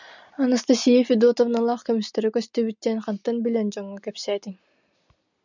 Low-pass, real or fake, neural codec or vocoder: 7.2 kHz; real; none